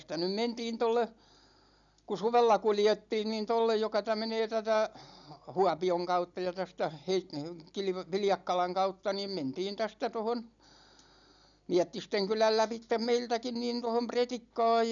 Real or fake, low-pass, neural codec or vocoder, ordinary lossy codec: real; 7.2 kHz; none; none